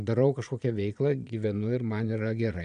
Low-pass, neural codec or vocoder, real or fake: 9.9 kHz; vocoder, 22.05 kHz, 80 mel bands, Vocos; fake